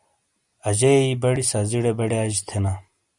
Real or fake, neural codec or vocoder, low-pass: real; none; 10.8 kHz